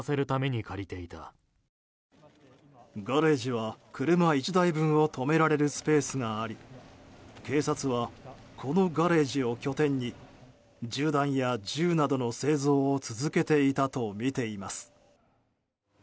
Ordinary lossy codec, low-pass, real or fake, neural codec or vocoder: none; none; real; none